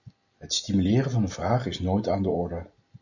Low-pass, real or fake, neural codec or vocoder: 7.2 kHz; real; none